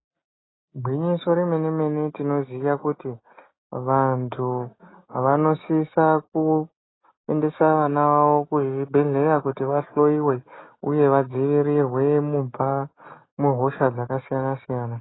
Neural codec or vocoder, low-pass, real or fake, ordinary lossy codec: none; 7.2 kHz; real; AAC, 16 kbps